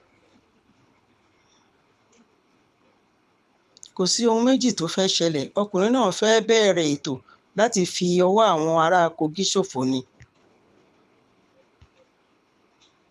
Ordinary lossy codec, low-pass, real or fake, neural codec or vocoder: none; none; fake; codec, 24 kHz, 6 kbps, HILCodec